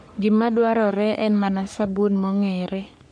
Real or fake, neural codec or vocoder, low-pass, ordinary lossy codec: fake; codec, 44.1 kHz, 3.4 kbps, Pupu-Codec; 9.9 kHz; MP3, 64 kbps